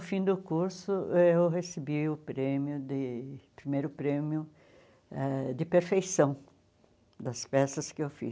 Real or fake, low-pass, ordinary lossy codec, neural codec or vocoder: real; none; none; none